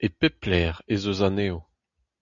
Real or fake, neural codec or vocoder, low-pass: real; none; 7.2 kHz